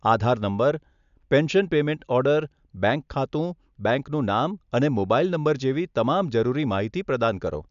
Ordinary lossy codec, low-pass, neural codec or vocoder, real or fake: none; 7.2 kHz; none; real